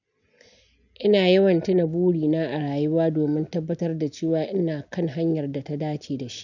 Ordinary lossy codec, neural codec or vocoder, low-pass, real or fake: none; none; 7.2 kHz; real